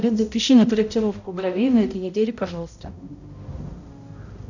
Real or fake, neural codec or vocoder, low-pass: fake; codec, 16 kHz, 0.5 kbps, X-Codec, HuBERT features, trained on balanced general audio; 7.2 kHz